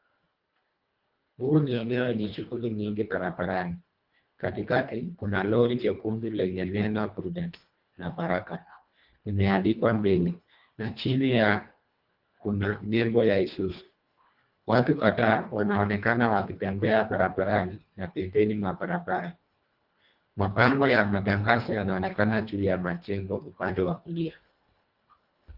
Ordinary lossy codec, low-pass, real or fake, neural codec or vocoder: Opus, 32 kbps; 5.4 kHz; fake; codec, 24 kHz, 1.5 kbps, HILCodec